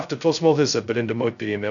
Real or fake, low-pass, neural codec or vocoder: fake; 7.2 kHz; codec, 16 kHz, 0.2 kbps, FocalCodec